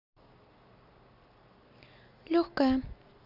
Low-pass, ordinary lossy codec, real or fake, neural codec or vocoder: 5.4 kHz; none; real; none